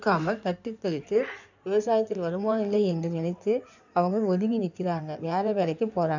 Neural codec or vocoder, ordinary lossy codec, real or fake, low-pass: codec, 16 kHz in and 24 kHz out, 2.2 kbps, FireRedTTS-2 codec; none; fake; 7.2 kHz